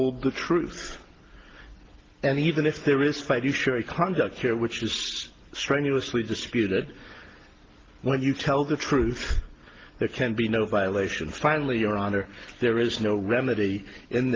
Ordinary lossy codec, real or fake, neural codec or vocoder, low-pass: Opus, 16 kbps; real; none; 7.2 kHz